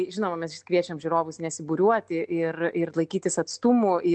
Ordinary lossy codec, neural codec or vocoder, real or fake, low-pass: MP3, 64 kbps; none; real; 9.9 kHz